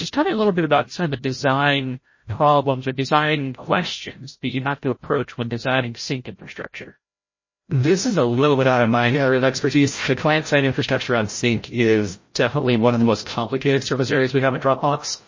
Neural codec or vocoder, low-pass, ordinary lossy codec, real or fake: codec, 16 kHz, 0.5 kbps, FreqCodec, larger model; 7.2 kHz; MP3, 32 kbps; fake